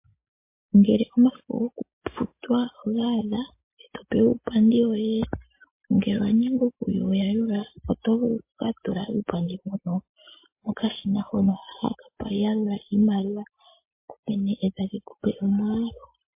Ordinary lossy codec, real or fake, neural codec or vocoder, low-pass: MP3, 24 kbps; real; none; 3.6 kHz